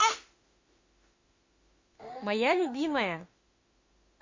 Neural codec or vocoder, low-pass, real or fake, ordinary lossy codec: autoencoder, 48 kHz, 32 numbers a frame, DAC-VAE, trained on Japanese speech; 7.2 kHz; fake; MP3, 32 kbps